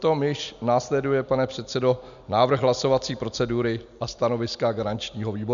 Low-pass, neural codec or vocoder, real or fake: 7.2 kHz; none; real